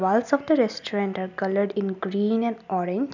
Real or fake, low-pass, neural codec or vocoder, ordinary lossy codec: real; 7.2 kHz; none; none